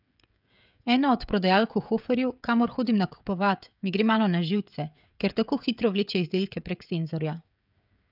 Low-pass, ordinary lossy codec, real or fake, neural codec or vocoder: 5.4 kHz; none; fake; codec, 16 kHz, 16 kbps, FreqCodec, smaller model